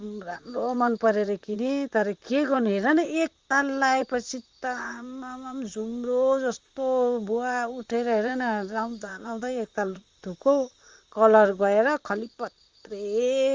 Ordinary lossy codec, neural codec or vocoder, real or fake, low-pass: Opus, 32 kbps; vocoder, 44.1 kHz, 128 mel bands, Pupu-Vocoder; fake; 7.2 kHz